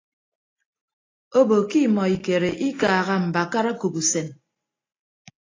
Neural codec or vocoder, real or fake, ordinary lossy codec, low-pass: none; real; AAC, 32 kbps; 7.2 kHz